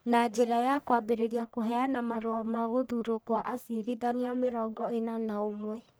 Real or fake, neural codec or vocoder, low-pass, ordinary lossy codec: fake; codec, 44.1 kHz, 1.7 kbps, Pupu-Codec; none; none